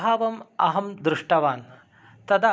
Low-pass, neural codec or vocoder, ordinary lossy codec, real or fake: none; none; none; real